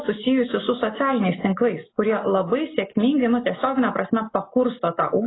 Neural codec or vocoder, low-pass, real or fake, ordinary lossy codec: none; 7.2 kHz; real; AAC, 16 kbps